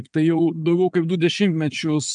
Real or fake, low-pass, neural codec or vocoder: fake; 9.9 kHz; vocoder, 22.05 kHz, 80 mel bands, Vocos